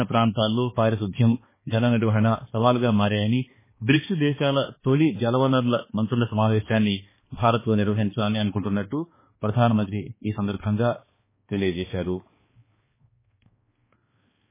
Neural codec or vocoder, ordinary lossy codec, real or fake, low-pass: codec, 16 kHz, 2 kbps, X-Codec, HuBERT features, trained on balanced general audio; MP3, 16 kbps; fake; 3.6 kHz